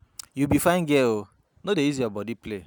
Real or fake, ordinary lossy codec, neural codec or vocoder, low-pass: real; none; none; none